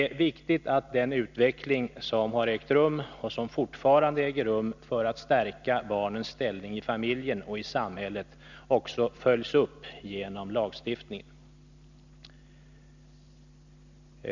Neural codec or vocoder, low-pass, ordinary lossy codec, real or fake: none; 7.2 kHz; none; real